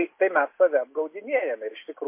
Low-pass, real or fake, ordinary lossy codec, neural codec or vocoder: 3.6 kHz; real; MP3, 24 kbps; none